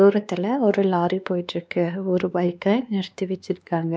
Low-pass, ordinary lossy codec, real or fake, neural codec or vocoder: none; none; fake; codec, 16 kHz, 2 kbps, X-Codec, WavLM features, trained on Multilingual LibriSpeech